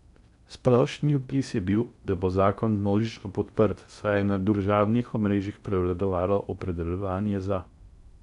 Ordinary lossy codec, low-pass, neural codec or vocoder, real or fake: none; 10.8 kHz; codec, 16 kHz in and 24 kHz out, 0.6 kbps, FocalCodec, streaming, 2048 codes; fake